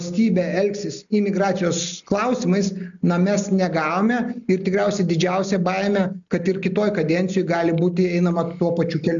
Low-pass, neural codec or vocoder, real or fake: 7.2 kHz; none; real